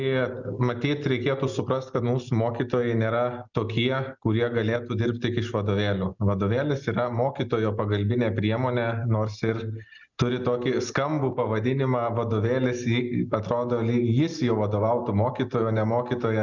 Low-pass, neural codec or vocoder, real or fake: 7.2 kHz; none; real